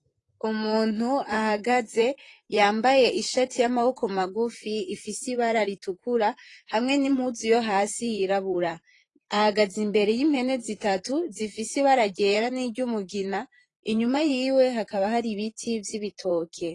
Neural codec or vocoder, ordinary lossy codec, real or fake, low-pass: vocoder, 44.1 kHz, 128 mel bands, Pupu-Vocoder; AAC, 32 kbps; fake; 10.8 kHz